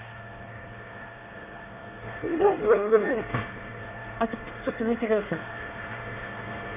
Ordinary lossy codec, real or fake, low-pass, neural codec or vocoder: none; fake; 3.6 kHz; codec, 24 kHz, 1 kbps, SNAC